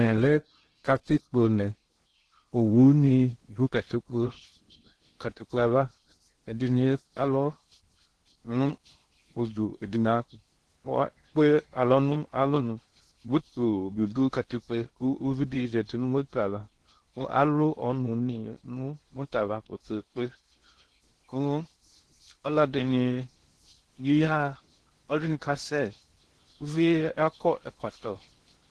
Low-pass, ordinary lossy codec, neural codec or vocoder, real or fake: 10.8 kHz; Opus, 16 kbps; codec, 16 kHz in and 24 kHz out, 0.6 kbps, FocalCodec, streaming, 2048 codes; fake